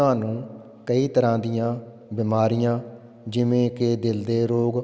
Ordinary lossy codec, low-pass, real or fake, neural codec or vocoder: none; none; real; none